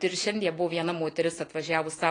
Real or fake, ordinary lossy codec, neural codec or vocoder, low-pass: real; AAC, 32 kbps; none; 9.9 kHz